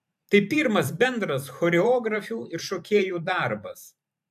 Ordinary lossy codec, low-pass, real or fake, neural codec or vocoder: AAC, 96 kbps; 14.4 kHz; fake; vocoder, 44.1 kHz, 128 mel bands every 512 samples, BigVGAN v2